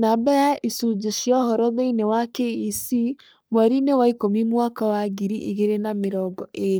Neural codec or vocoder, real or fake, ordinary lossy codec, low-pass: codec, 44.1 kHz, 3.4 kbps, Pupu-Codec; fake; none; none